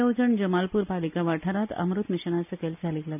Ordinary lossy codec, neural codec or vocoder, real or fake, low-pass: none; none; real; 3.6 kHz